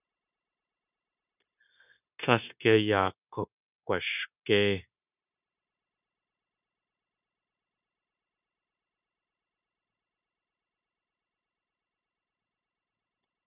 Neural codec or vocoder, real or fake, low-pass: codec, 16 kHz, 0.9 kbps, LongCat-Audio-Codec; fake; 3.6 kHz